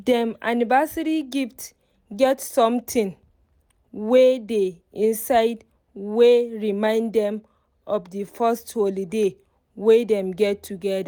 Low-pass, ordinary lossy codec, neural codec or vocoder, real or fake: none; none; none; real